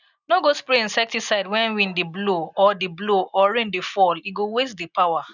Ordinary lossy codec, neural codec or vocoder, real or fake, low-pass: none; none; real; 7.2 kHz